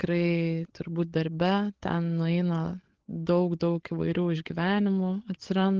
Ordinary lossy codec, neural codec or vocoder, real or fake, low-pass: Opus, 16 kbps; codec, 16 kHz, 8 kbps, FreqCodec, larger model; fake; 7.2 kHz